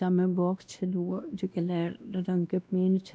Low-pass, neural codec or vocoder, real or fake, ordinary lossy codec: none; codec, 16 kHz, 1 kbps, X-Codec, WavLM features, trained on Multilingual LibriSpeech; fake; none